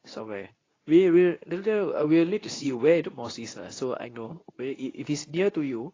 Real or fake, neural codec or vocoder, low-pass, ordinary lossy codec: fake; codec, 24 kHz, 0.9 kbps, WavTokenizer, medium speech release version 2; 7.2 kHz; AAC, 32 kbps